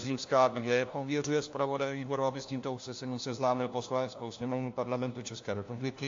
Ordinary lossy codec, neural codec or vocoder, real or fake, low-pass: AAC, 48 kbps; codec, 16 kHz, 1 kbps, FunCodec, trained on LibriTTS, 50 frames a second; fake; 7.2 kHz